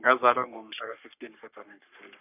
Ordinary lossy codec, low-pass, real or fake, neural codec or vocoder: none; 3.6 kHz; fake; codec, 44.1 kHz, 3.4 kbps, Pupu-Codec